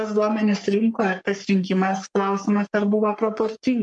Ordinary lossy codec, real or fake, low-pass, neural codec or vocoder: MP3, 64 kbps; fake; 10.8 kHz; codec, 44.1 kHz, 3.4 kbps, Pupu-Codec